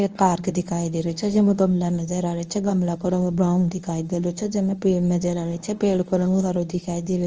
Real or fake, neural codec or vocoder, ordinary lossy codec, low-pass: fake; codec, 24 kHz, 0.9 kbps, WavTokenizer, medium speech release version 1; Opus, 24 kbps; 7.2 kHz